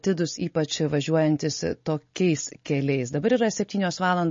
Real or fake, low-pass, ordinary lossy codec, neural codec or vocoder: real; 7.2 kHz; MP3, 32 kbps; none